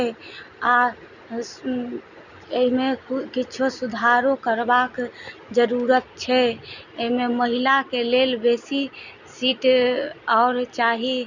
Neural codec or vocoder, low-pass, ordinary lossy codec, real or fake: none; 7.2 kHz; none; real